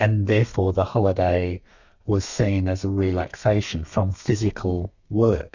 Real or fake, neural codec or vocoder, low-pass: fake; codec, 32 kHz, 1.9 kbps, SNAC; 7.2 kHz